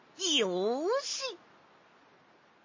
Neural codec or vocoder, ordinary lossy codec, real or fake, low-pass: none; MP3, 32 kbps; real; 7.2 kHz